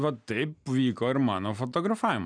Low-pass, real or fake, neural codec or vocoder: 9.9 kHz; real; none